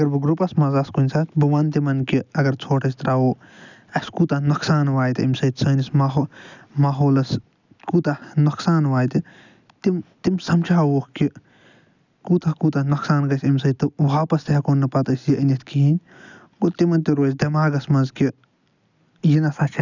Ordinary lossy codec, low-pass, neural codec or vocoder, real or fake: none; 7.2 kHz; none; real